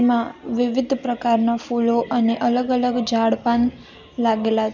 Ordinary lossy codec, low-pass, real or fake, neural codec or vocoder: none; 7.2 kHz; real; none